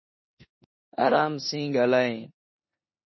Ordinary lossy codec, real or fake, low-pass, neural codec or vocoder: MP3, 24 kbps; fake; 7.2 kHz; codec, 16 kHz in and 24 kHz out, 0.9 kbps, LongCat-Audio-Codec, four codebook decoder